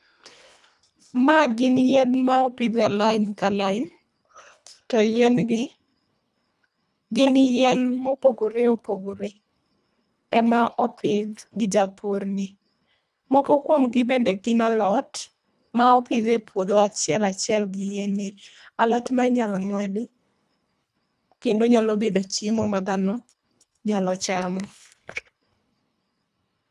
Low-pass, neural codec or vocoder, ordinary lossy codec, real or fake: none; codec, 24 kHz, 1.5 kbps, HILCodec; none; fake